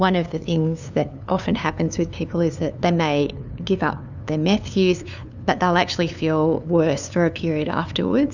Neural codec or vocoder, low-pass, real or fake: codec, 16 kHz, 2 kbps, FunCodec, trained on LibriTTS, 25 frames a second; 7.2 kHz; fake